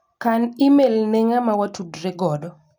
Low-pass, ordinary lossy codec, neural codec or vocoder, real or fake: 19.8 kHz; none; none; real